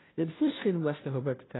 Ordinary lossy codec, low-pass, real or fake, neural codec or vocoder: AAC, 16 kbps; 7.2 kHz; fake; codec, 16 kHz, 0.5 kbps, FunCodec, trained on Chinese and English, 25 frames a second